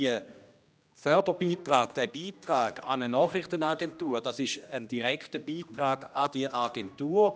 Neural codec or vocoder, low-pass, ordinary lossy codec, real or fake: codec, 16 kHz, 1 kbps, X-Codec, HuBERT features, trained on general audio; none; none; fake